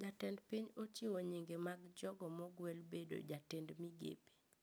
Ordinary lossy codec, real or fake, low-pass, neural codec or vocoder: none; real; none; none